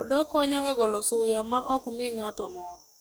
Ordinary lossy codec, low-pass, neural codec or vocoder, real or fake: none; none; codec, 44.1 kHz, 2.6 kbps, DAC; fake